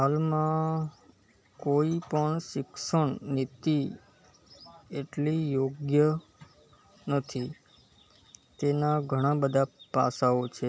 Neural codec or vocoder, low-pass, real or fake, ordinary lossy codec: none; none; real; none